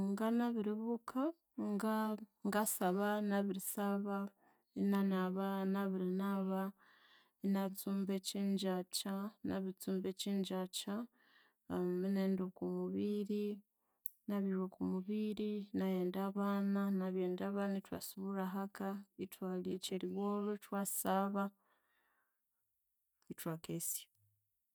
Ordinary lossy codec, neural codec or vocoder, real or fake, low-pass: none; none; real; none